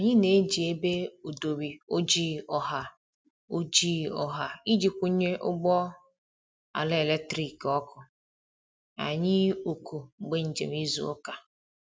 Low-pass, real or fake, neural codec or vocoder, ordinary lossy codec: none; real; none; none